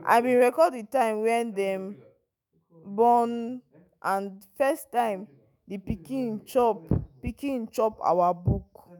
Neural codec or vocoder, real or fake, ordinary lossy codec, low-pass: autoencoder, 48 kHz, 128 numbers a frame, DAC-VAE, trained on Japanese speech; fake; none; none